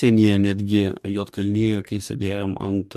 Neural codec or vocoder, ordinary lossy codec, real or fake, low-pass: codec, 44.1 kHz, 2.6 kbps, DAC; MP3, 96 kbps; fake; 14.4 kHz